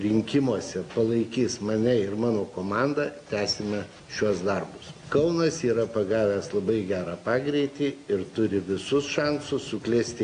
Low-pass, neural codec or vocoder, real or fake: 9.9 kHz; none; real